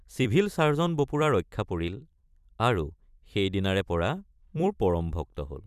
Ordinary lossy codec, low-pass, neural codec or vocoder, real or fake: none; 14.4 kHz; vocoder, 44.1 kHz, 128 mel bands every 256 samples, BigVGAN v2; fake